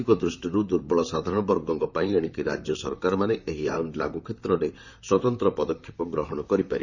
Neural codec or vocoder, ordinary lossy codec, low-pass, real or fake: vocoder, 44.1 kHz, 128 mel bands, Pupu-Vocoder; none; 7.2 kHz; fake